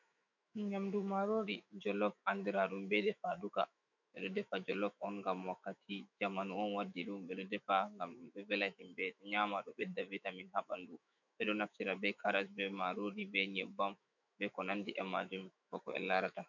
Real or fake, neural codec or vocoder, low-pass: fake; autoencoder, 48 kHz, 128 numbers a frame, DAC-VAE, trained on Japanese speech; 7.2 kHz